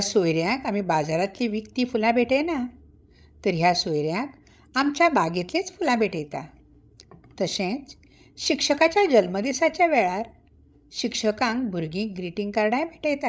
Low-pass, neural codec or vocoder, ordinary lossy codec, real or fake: none; codec, 16 kHz, 16 kbps, FreqCodec, larger model; none; fake